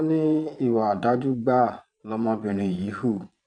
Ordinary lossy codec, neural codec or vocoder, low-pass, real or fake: none; vocoder, 22.05 kHz, 80 mel bands, Vocos; 9.9 kHz; fake